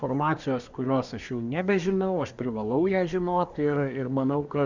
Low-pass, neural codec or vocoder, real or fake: 7.2 kHz; codec, 24 kHz, 1 kbps, SNAC; fake